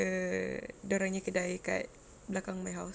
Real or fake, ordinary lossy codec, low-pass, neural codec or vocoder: real; none; none; none